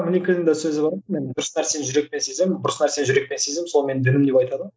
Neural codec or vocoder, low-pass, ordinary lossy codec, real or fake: none; none; none; real